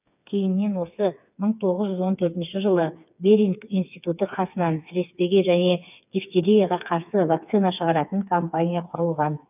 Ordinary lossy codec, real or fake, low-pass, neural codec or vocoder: none; fake; 3.6 kHz; codec, 16 kHz, 4 kbps, FreqCodec, smaller model